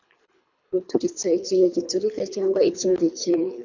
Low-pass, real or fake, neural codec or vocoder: 7.2 kHz; fake; codec, 24 kHz, 3 kbps, HILCodec